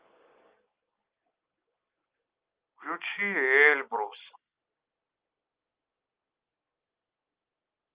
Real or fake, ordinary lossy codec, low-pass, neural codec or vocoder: real; Opus, 24 kbps; 3.6 kHz; none